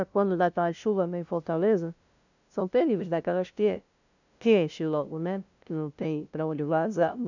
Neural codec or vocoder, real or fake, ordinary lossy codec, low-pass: codec, 16 kHz, 0.5 kbps, FunCodec, trained on LibriTTS, 25 frames a second; fake; none; 7.2 kHz